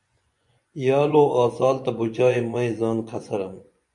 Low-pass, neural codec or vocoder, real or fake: 10.8 kHz; vocoder, 24 kHz, 100 mel bands, Vocos; fake